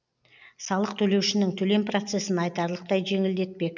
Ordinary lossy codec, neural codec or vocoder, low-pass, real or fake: none; none; 7.2 kHz; real